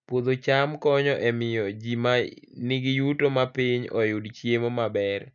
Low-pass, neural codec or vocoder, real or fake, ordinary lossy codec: 7.2 kHz; none; real; none